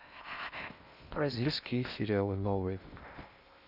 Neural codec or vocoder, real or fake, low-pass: codec, 16 kHz in and 24 kHz out, 0.6 kbps, FocalCodec, streaming, 2048 codes; fake; 5.4 kHz